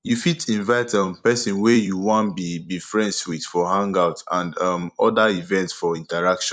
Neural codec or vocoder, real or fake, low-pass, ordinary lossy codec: vocoder, 44.1 kHz, 128 mel bands every 256 samples, BigVGAN v2; fake; 9.9 kHz; none